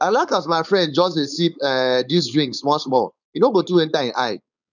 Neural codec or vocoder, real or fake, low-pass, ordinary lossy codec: codec, 16 kHz, 4.8 kbps, FACodec; fake; 7.2 kHz; none